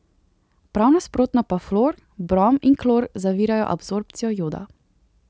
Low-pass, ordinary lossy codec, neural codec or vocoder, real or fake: none; none; none; real